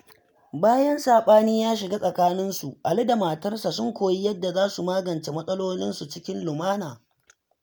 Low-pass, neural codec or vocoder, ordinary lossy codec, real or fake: none; none; none; real